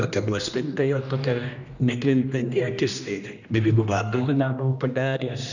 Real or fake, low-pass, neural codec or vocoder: fake; 7.2 kHz; codec, 16 kHz, 1 kbps, X-Codec, HuBERT features, trained on general audio